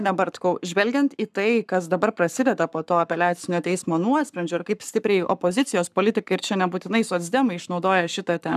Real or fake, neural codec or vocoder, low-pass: fake; codec, 44.1 kHz, 7.8 kbps, Pupu-Codec; 14.4 kHz